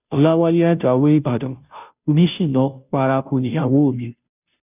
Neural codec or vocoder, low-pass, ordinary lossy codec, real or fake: codec, 16 kHz, 0.5 kbps, FunCodec, trained on Chinese and English, 25 frames a second; 3.6 kHz; none; fake